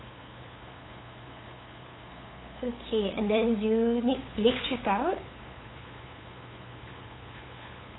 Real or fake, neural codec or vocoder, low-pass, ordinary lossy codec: fake; codec, 16 kHz, 8 kbps, FunCodec, trained on LibriTTS, 25 frames a second; 7.2 kHz; AAC, 16 kbps